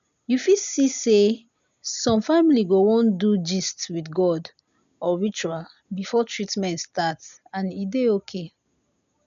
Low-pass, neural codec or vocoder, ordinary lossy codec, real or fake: 7.2 kHz; none; none; real